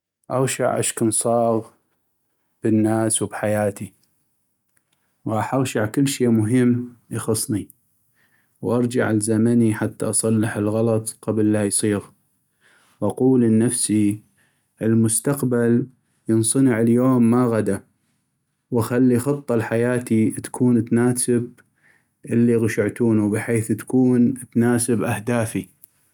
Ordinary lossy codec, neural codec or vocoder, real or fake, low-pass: none; none; real; 19.8 kHz